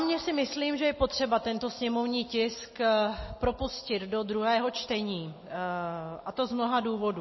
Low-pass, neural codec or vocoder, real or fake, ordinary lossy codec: 7.2 kHz; none; real; MP3, 24 kbps